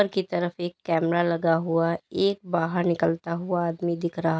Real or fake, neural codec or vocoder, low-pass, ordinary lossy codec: real; none; none; none